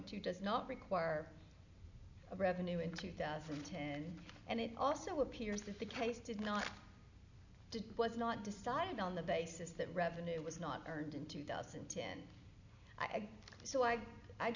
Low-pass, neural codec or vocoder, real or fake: 7.2 kHz; none; real